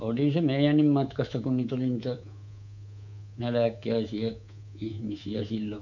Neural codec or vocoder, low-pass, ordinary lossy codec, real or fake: codec, 24 kHz, 3.1 kbps, DualCodec; 7.2 kHz; none; fake